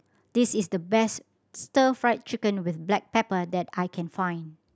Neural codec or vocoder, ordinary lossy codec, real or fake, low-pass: none; none; real; none